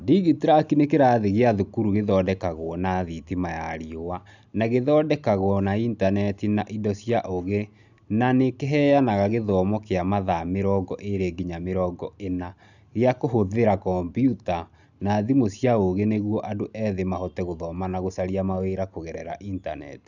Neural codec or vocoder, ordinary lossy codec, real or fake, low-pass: none; none; real; 7.2 kHz